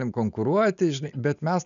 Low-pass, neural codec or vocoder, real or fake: 7.2 kHz; none; real